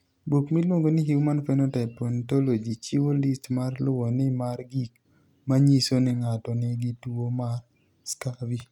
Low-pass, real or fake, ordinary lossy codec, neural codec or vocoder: 19.8 kHz; real; none; none